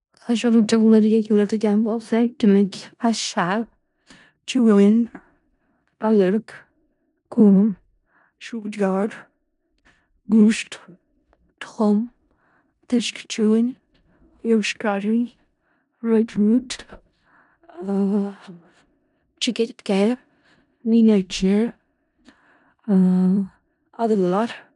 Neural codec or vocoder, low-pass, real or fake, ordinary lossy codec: codec, 16 kHz in and 24 kHz out, 0.4 kbps, LongCat-Audio-Codec, four codebook decoder; 10.8 kHz; fake; none